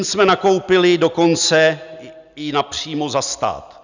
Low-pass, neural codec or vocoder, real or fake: 7.2 kHz; none; real